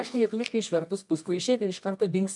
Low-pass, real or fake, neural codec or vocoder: 10.8 kHz; fake; codec, 24 kHz, 0.9 kbps, WavTokenizer, medium music audio release